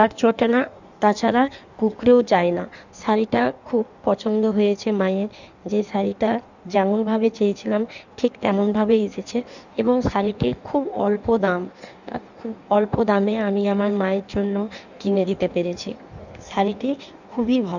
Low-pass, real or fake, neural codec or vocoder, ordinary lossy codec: 7.2 kHz; fake; codec, 16 kHz in and 24 kHz out, 1.1 kbps, FireRedTTS-2 codec; none